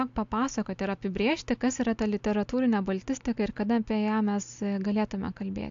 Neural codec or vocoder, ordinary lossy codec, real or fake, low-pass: none; AAC, 64 kbps; real; 7.2 kHz